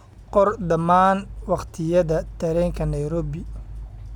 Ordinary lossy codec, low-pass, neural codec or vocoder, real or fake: none; 19.8 kHz; none; real